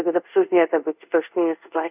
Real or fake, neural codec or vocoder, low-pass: fake; codec, 24 kHz, 0.5 kbps, DualCodec; 3.6 kHz